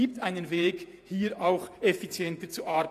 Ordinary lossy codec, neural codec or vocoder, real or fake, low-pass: AAC, 64 kbps; vocoder, 48 kHz, 128 mel bands, Vocos; fake; 14.4 kHz